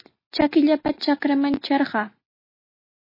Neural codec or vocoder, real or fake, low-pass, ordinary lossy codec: none; real; 5.4 kHz; MP3, 24 kbps